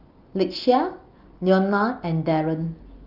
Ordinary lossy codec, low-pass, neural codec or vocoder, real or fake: Opus, 32 kbps; 5.4 kHz; none; real